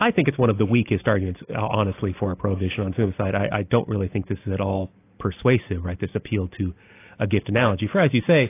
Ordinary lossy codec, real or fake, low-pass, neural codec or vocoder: AAC, 24 kbps; real; 3.6 kHz; none